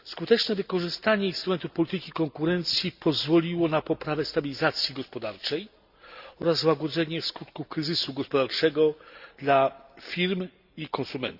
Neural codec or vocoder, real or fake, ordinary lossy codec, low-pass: none; real; Opus, 64 kbps; 5.4 kHz